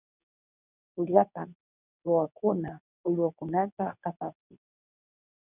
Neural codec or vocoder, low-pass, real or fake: codec, 24 kHz, 0.9 kbps, WavTokenizer, medium speech release version 2; 3.6 kHz; fake